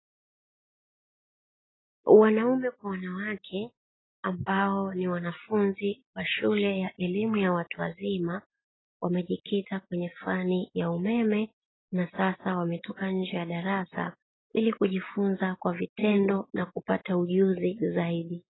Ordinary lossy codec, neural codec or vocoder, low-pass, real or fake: AAC, 16 kbps; none; 7.2 kHz; real